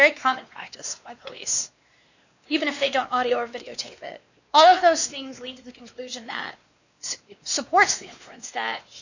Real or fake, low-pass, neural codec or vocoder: fake; 7.2 kHz; codec, 16 kHz, 2 kbps, X-Codec, WavLM features, trained on Multilingual LibriSpeech